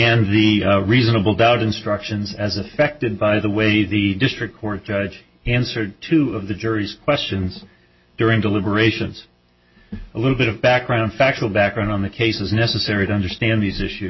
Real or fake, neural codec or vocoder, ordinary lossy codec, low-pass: real; none; MP3, 24 kbps; 7.2 kHz